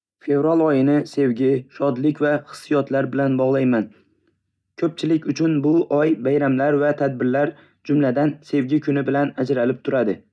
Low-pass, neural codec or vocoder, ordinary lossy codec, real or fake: none; none; none; real